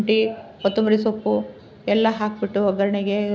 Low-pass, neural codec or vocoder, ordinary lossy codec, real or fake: none; none; none; real